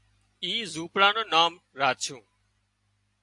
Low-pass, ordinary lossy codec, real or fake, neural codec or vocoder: 10.8 kHz; AAC, 64 kbps; real; none